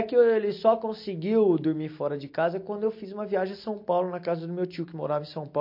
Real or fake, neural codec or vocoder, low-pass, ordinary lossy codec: real; none; 5.4 kHz; MP3, 32 kbps